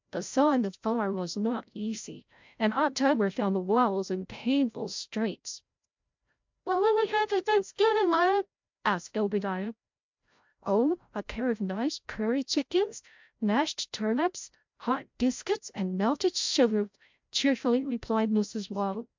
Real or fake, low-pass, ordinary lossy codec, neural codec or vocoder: fake; 7.2 kHz; MP3, 64 kbps; codec, 16 kHz, 0.5 kbps, FreqCodec, larger model